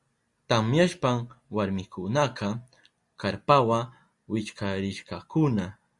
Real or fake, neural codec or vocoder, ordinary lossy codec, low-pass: real; none; Opus, 64 kbps; 10.8 kHz